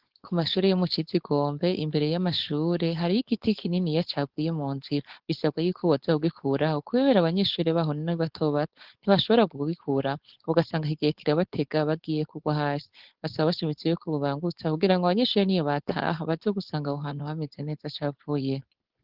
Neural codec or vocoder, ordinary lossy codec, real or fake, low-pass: codec, 16 kHz, 4.8 kbps, FACodec; Opus, 16 kbps; fake; 5.4 kHz